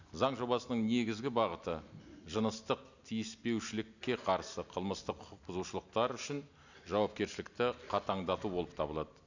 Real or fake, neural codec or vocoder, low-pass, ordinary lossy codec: real; none; 7.2 kHz; none